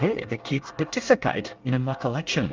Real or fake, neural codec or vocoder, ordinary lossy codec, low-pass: fake; codec, 24 kHz, 1 kbps, SNAC; Opus, 32 kbps; 7.2 kHz